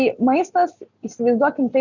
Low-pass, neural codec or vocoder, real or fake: 7.2 kHz; none; real